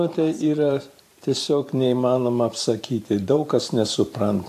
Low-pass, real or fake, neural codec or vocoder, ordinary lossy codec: 14.4 kHz; fake; vocoder, 44.1 kHz, 128 mel bands every 512 samples, BigVGAN v2; AAC, 64 kbps